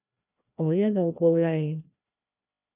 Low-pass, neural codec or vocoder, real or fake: 3.6 kHz; codec, 16 kHz, 1 kbps, FreqCodec, larger model; fake